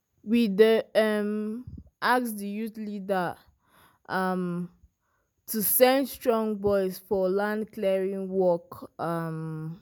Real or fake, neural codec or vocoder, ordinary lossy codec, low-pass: real; none; none; none